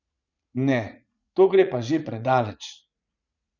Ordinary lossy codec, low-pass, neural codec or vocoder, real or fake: none; 7.2 kHz; vocoder, 44.1 kHz, 80 mel bands, Vocos; fake